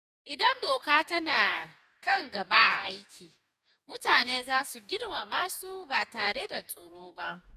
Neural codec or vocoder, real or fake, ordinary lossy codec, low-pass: codec, 44.1 kHz, 2.6 kbps, DAC; fake; none; 14.4 kHz